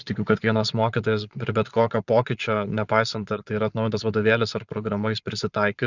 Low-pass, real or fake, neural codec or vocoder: 7.2 kHz; fake; vocoder, 22.05 kHz, 80 mel bands, Vocos